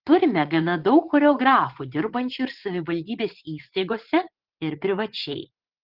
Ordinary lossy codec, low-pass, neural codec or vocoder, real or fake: Opus, 16 kbps; 5.4 kHz; vocoder, 44.1 kHz, 80 mel bands, Vocos; fake